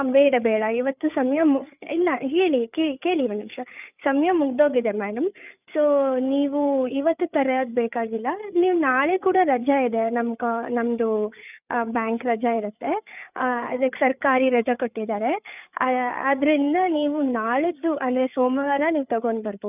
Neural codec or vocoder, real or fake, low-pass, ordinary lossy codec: codec, 16 kHz, 8 kbps, FreqCodec, larger model; fake; 3.6 kHz; none